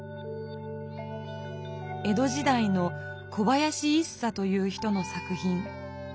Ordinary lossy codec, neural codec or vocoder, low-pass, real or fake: none; none; none; real